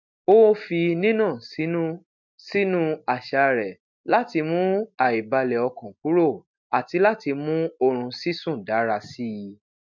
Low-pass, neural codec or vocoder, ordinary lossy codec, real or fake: 7.2 kHz; none; MP3, 64 kbps; real